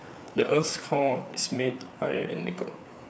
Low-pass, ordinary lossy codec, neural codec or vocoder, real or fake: none; none; codec, 16 kHz, 4 kbps, FreqCodec, larger model; fake